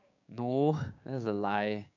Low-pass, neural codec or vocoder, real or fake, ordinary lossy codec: 7.2 kHz; codec, 16 kHz, 6 kbps, DAC; fake; none